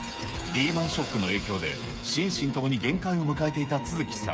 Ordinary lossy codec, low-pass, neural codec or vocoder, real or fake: none; none; codec, 16 kHz, 8 kbps, FreqCodec, smaller model; fake